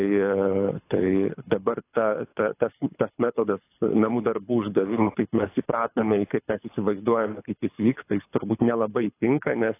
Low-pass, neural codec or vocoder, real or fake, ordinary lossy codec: 3.6 kHz; codec, 24 kHz, 6 kbps, HILCodec; fake; AAC, 24 kbps